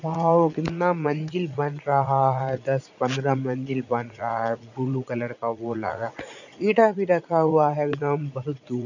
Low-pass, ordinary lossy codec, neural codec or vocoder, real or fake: 7.2 kHz; none; vocoder, 44.1 kHz, 80 mel bands, Vocos; fake